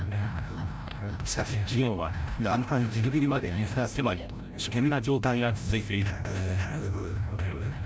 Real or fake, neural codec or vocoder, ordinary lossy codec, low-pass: fake; codec, 16 kHz, 0.5 kbps, FreqCodec, larger model; none; none